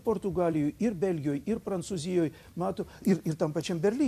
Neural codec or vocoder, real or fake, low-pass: vocoder, 44.1 kHz, 128 mel bands every 256 samples, BigVGAN v2; fake; 14.4 kHz